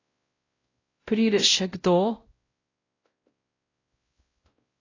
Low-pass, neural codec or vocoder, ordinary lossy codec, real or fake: 7.2 kHz; codec, 16 kHz, 0.5 kbps, X-Codec, WavLM features, trained on Multilingual LibriSpeech; AAC, 32 kbps; fake